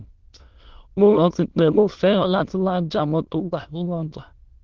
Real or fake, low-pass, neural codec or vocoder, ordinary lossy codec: fake; 7.2 kHz; autoencoder, 22.05 kHz, a latent of 192 numbers a frame, VITS, trained on many speakers; Opus, 16 kbps